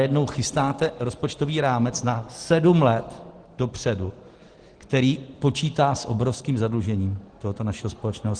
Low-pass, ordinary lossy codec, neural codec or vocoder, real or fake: 9.9 kHz; Opus, 16 kbps; none; real